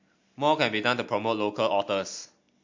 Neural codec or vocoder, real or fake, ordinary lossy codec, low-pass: none; real; MP3, 48 kbps; 7.2 kHz